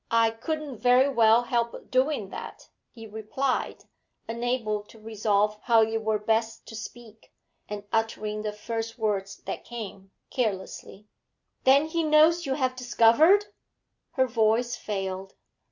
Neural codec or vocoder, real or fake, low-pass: none; real; 7.2 kHz